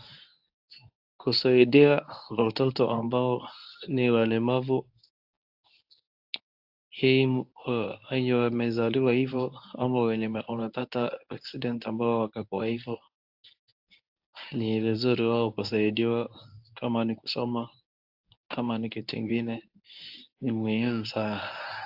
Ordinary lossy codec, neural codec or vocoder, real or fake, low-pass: AAC, 48 kbps; codec, 24 kHz, 0.9 kbps, WavTokenizer, medium speech release version 1; fake; 5.4 kHz